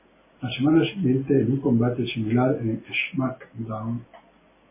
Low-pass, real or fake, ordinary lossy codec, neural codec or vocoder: 3.6 kHz; real; MP3, 16 kbps; none